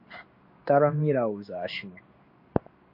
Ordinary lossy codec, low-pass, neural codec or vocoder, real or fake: MP3, 32 kbps; 5.4 kHz; codec, 16 kHz in and 24 kHz out, 1 kbps, XY-Tokenizer; fake